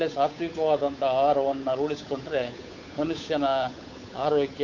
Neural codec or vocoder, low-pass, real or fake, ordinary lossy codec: codec, 16 kHz, 8 kbps, FunCodec, trained on Chinese and English, 25 frames a second; 7.2 kHz; fake; MP3, 64 kbps